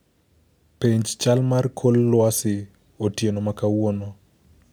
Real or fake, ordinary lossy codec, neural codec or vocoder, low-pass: real; none; none; none